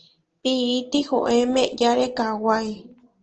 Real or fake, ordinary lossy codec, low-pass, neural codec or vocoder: real; Opus, 16 kbps; 7.2 kHz; none